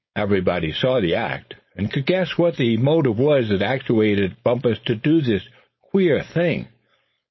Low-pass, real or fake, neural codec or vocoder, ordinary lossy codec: 7.2 kHz; fake; codec, 16 kHz, 4.8 kbps, FACodec; MP3, 24 kbps